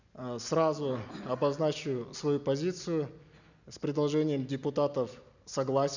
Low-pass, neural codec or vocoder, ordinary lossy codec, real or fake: 7.2 kHz; none; none; real